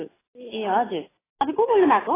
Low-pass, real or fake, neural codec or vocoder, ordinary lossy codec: 3.6 kHz; real; none; AAC, 16 kbps